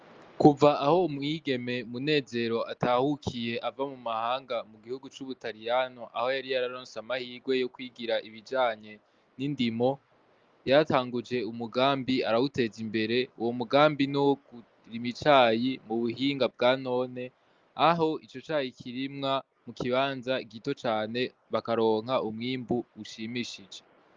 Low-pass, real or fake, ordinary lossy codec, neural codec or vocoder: 7.2 kHz; real; Opus, 32 kbps; none